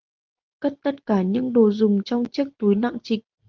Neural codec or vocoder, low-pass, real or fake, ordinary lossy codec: none; 7.2 kHz; real; Opus, 32 kbps